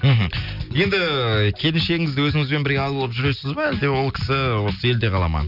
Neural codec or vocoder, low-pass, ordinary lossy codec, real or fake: none; 5.4 kHz; none; real